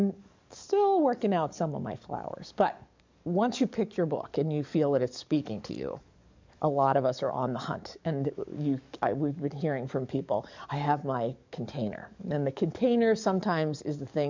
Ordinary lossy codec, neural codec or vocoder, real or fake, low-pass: MP3, 64 kbps; codec, 44.1 kHz, 7.8 kbps, DAC; fake; 7.2 kHz